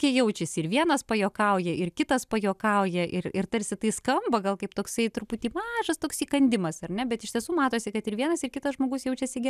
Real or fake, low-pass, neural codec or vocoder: real; 14.4 kHz; none